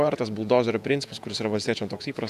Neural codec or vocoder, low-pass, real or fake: vocoder, 48 kHz, 128 mel bands, Vocos; 14.4 kHz; fake